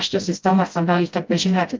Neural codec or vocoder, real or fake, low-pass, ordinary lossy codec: codec, 16 kHz, 0.5 kbps, FreqCodec, smaller model; fake; 7.2 kHz; Opus, 24 kbps